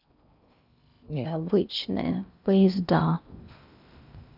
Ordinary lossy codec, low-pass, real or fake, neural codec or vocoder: none; 5.4 kHz; fake; codec, 16 kHz in and 24 kHz out, 0.8 kbps, FocalCodec, streaming, 65536 codes